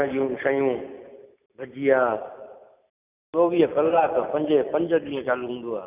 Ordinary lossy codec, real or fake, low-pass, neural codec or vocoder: none; real; 3.6 kHz; none